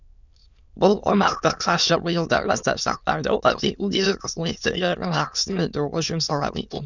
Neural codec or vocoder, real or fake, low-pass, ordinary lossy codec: autoencoder, 22.05 kHz, a latent of 192 numbers a frame, VITS, trained on many speakers; fake; 7.2 kHz; none